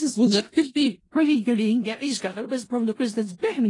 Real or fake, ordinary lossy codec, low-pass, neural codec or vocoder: fake; AAC, 32 kbps; 10.8 kHz; codec, 16 kHz in and 24 kHz out, 0.4 kbps, LongCat-Audio-Codec, four codebook decoder